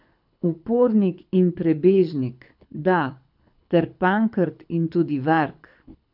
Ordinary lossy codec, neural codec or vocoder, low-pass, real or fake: none; codec, 24 kHz, 6 kbps, HILCodec; 5.4 kHz; fake